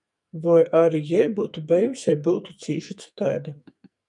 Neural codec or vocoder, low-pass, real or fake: codec, 44.1 kHz, 2.6 kbps, SNAC; 10.8 kHz; fake